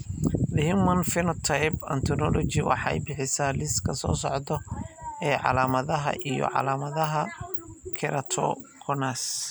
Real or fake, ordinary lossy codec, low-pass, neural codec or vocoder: real; none; none; none